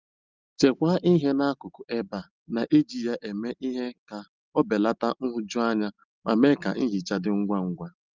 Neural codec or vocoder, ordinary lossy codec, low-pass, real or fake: none; Opus, 24 kbps; 7.2 kHz; real